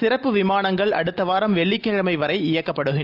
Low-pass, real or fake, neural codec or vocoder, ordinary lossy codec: 5.4 kHz; real; none; Opus, 24 kbps